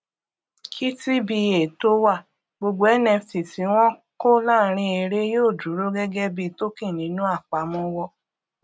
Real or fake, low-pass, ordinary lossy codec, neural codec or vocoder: real; none; none; none